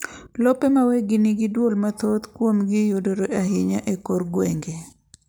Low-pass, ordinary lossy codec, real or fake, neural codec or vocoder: none; none; real; none